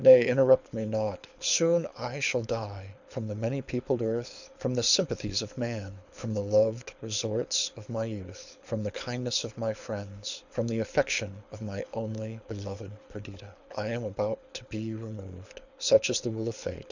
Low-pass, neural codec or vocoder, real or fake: 7.2 kHz; codec, 24 kHz, 6 kbps, HILCodec; fake